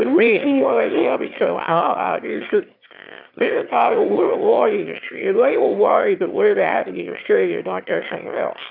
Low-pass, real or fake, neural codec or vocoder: 5.4 kHz; fake; autoencoder, 22.05 kHz, a latent of 192 numbers a frame, VITS, trained on one speaker